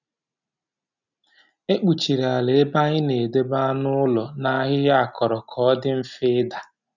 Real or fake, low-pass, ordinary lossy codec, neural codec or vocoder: real; 7.2 kHz; none; none